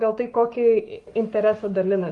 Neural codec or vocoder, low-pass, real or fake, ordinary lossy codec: codec, 44.1 kHz, 7.8 kbps, Pupu-Codec; 10.8 kHz; fake; Opus, 32 kbps